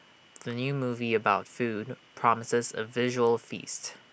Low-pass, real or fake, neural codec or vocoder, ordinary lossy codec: none; real; none; none